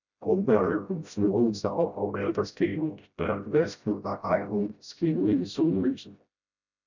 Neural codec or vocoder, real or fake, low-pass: codec, 16 kHz, 0.5 kbps, FreqCodec, smaller model; fake; 7.2 kHz